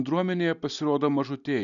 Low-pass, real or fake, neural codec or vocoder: 7.2 kHz; real; none